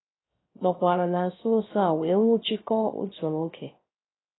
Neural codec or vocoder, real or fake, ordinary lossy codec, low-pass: codec, 16 kHz, 0.3 kbps, FocalCodec; fake; AAC, 16 kbps; 7.2 kHz